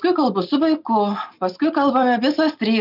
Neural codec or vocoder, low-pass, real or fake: none; 5.4 kHz; real